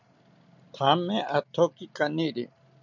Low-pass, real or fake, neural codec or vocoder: 7.2 kHz; real; none